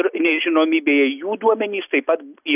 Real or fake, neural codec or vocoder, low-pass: real; none; 3.6 kHz